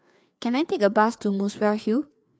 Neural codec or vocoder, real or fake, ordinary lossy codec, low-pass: codec, 16 kHz, 4 kbps, FreqCodec, larger model; fake; none; none